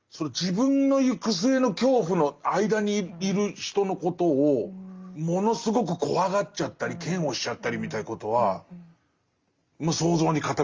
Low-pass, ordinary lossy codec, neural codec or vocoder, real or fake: 7.2 kHz; Opus, 32 kbps; none; real